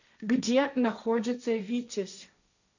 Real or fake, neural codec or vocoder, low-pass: fake; codec, 16 kHz, 1.1 kbps, Voila-Tokenizer; 7.2 kHz